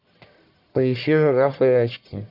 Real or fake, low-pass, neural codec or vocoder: fake; 5.4 kHz; codec, 44.1 kHz, 1.7 kbps, Pupu-Codec